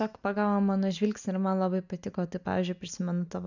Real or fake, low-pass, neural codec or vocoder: real; 7.2 kHz; none